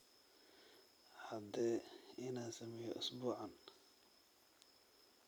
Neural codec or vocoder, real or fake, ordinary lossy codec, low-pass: vocoder, 44.1 kHz, 128 mel bands every 256 samples, BigVGAN v2; fake; none; none